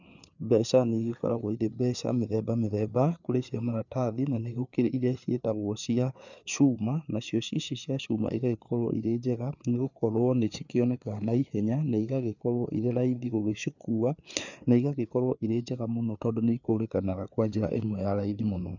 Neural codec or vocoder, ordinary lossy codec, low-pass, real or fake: codec, 16 kHz, 4 kbps, FreqCodec, larger model; none; 7.2 kHz; fake